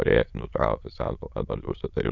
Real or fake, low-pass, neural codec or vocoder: fake; 7.2 kHz; autoencoder, 22.05 kHz, a latent of 192 numbers a frame, VITS, trained on many speakers